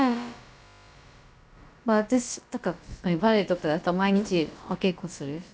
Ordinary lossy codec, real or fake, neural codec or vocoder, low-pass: none; fake; codec, 16 kHz, about 1 kbps, DyCAST, with the encoder's durations; none